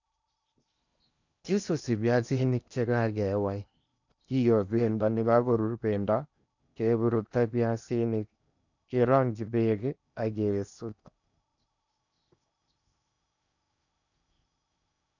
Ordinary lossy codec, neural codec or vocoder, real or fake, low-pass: none; codec, 16 kHz in and 24 kHz out, 0.8 kbps, FocalCodec, streaming, 65536 codes; fake; 7.2 kHz